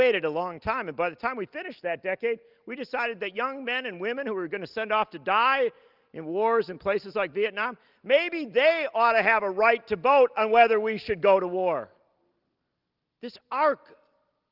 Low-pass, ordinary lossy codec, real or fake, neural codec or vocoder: 5.4 kHz; Opus, 24 kbps; real; none